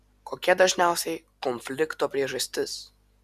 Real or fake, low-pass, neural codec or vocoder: real; 14.4 kHz; none